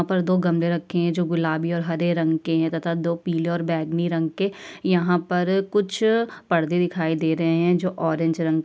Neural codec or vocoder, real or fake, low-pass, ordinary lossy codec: none; real; none; none